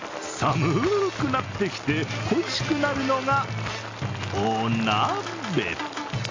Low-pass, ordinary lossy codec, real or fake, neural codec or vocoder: 7.2 kHz; AAC, 32 kbps; real; none